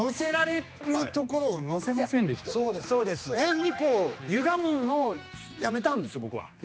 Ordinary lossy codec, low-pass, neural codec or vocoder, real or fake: none; none; codec, 16 kHz, 2 kbps, X-Codec, HuBERT features, trained on general audio; fake